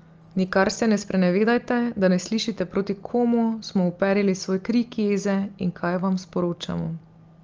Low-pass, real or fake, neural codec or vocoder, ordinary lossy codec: 7.2 kHz; real; none; Opus, 24 kbps